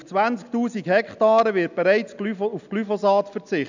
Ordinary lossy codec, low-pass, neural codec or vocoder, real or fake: none; 7.2 kHz; none; real